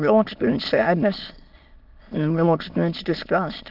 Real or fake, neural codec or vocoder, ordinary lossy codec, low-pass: fake; autoencoder, 22.05 kHz, a latent of 192 numbers a frame, VITS, trained on many speakers; Opus, 24 kbps; 5.4 kHz